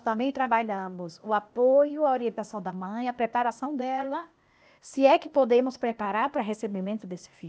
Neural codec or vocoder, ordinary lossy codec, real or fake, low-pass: codec, 16 kHz, 0.8 kbps, ZipCodec; none; fake; none